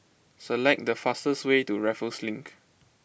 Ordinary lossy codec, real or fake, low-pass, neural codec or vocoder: none; real; none; none